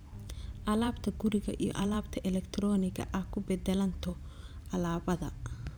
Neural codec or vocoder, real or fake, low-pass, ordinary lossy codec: vocoder, 44.1 kHz, 128 mel bands every 512 samples, BigVGAN v2; fake; none; none